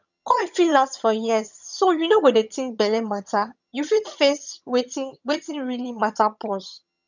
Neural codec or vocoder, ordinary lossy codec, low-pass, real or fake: vocoder, 22.05 kHz, 80 mel bands, HiFi-GAN; none; 7.2 kHz; fake